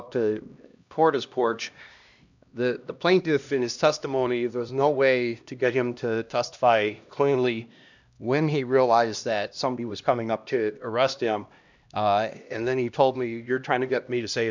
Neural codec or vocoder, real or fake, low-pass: codec, 16 kHz, 1 kbps, X-Codec, HuBERT features, trained on LibriSpeech; fake; 7.2 kHz